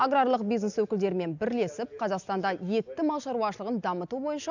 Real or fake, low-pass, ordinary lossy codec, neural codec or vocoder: real; 7.2 kHz; none; none